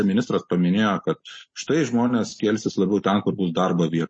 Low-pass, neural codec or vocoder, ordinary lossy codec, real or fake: 9.9 kHz; none; MP3, 32 kbps; real